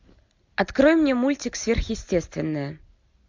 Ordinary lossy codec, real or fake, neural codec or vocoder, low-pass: MP3, 64 kbps; real; none; 7.2 kHz